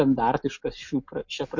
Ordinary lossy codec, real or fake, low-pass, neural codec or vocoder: MP3, 48 kbps; real; 7.2 kHz; none